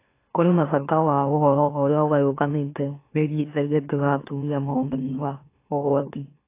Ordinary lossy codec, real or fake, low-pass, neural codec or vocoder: AAC, 24 kbps; fake; 3.6 kHz; autoencoder, 44.1 kHz, a latent of 192 numbers a frame, MeloTTS